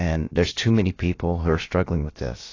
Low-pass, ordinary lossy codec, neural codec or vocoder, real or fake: 7.2 kHz; AAC, 32 kbps; codec, 16 kHz, about 1 kbps, DyCAST, with the encoder's durations; fake